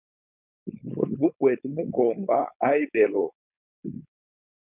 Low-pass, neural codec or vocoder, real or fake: 3.6 kHz; codec, 16 kHz, 4.8 kbps, FACodec; fake